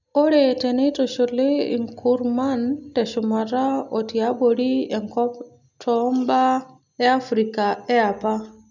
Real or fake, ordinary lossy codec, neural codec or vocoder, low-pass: real; none; none; 7.2 kHz